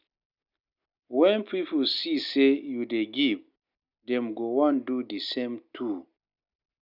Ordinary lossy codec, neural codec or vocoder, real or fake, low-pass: none; none; real; 5.4 kHz